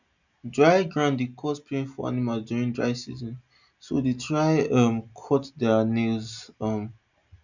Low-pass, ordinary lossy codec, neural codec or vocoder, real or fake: 7.2 kHz; none; none; real